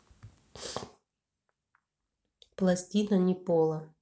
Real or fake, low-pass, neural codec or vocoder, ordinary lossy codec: real; none; none; none